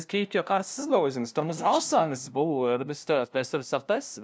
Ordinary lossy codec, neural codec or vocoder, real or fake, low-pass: none; codec, 16 kHz, 0.5 kbps, FunCodec, trained on LibriTTS, 25 frames a second; fake; none